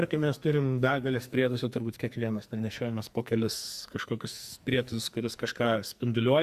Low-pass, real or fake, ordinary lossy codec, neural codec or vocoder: 14.4 kHz; fake; Opus, 64 kbps; codec, 44.1 kHz, 2.6 kbps, SNAC